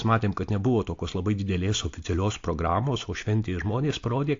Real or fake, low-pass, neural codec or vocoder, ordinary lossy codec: real; 7.2 kHz; none; AAC, 48 kbps